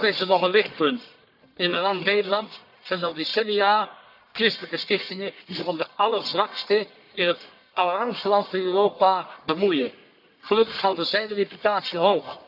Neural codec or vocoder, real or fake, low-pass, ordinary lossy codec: codec, 44.1 kHz, 1.7 kbps, Pupu-Codec; fake; 5.4 kHz; none